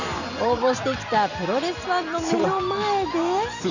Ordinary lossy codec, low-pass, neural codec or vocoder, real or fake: none; 7.2 kHz; none; real